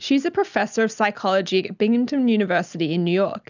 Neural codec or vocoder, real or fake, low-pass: none; real; 7.2 kHz